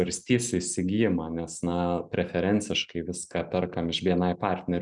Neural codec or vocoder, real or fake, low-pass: none; real; 10.8 kHz